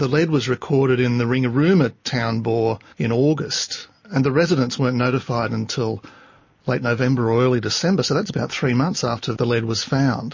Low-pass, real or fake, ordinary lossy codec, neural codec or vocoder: 7.2 kHz; real; MP3, 32 kbps; none